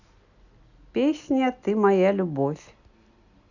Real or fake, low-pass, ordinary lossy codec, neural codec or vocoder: real; 7.2 kHz; none; none